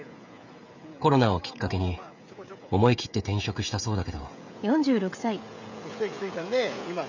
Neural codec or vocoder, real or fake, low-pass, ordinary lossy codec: codec, 16 kHz, 16 kbps, FreqCodec, smaller model; fake; 7.2 kHz; none